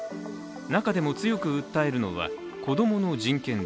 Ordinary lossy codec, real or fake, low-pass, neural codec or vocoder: none; real; none; none